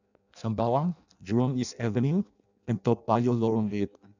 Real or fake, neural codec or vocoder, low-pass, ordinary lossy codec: fake; codec, 16 kHz in and 24 kHz out, 0.6 kbps, FireRedTTS-2 codec; 7.2 kHz; none